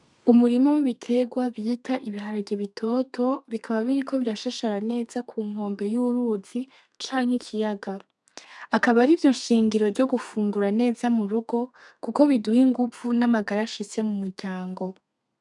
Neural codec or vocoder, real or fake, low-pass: codec, 32 kHz, 1.9 kbps, SNAC; fake; 10.8 kHz